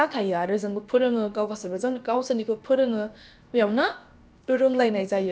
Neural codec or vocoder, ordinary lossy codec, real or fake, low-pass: codec, 16 kHz, about 1 kbps, DyCAST, with the encoder's durations; none; fake; none